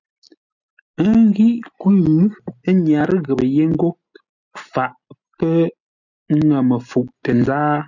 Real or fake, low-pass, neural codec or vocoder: real; 7.2 kHz; none